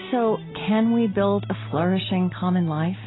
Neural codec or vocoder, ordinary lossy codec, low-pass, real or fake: none; AAC, 16 kbps; 7.2 kHz; real